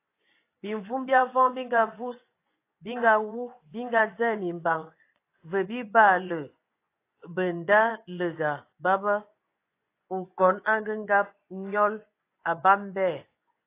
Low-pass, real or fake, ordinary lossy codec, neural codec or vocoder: 3.6 kHz; fake; AAC, 24 kbps; vocoder, 24 kHz, 100 mel bands, Vocos